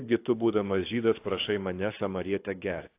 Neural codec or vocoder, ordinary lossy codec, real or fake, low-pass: codec, 24 kHz, 6 kbps, HILCodec; AAC, 24 kbps; fake; 3.6 kHz